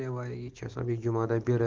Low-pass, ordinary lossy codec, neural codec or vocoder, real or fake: 7.2 kHz; Opus, 16 kbps; none; real